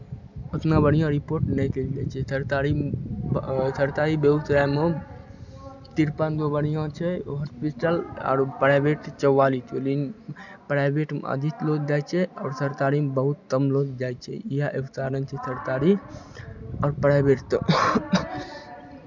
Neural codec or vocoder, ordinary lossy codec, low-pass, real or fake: none; none; 7.2 kHz; real